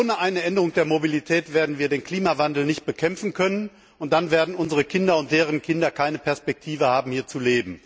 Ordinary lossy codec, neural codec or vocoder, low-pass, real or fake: none; none; none; real